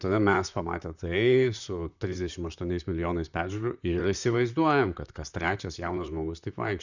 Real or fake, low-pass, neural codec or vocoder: fake; 7.2 kHz; vocoder, 44.1 kHz, 128 mel bands, Pupu-Vocoder